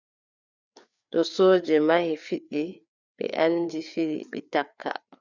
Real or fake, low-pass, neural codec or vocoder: fake; 7.2 kHz; codec, 16 kHz, 4 kbps, FreqCodec, larger model